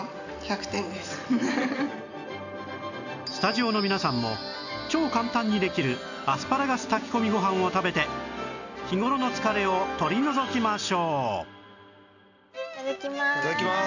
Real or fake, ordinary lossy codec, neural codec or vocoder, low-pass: real; none; none; 7.2 kHz